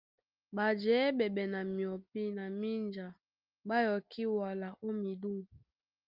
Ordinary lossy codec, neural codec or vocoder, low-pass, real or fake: Opus, 24 kbps; none; 5.4 kHz; real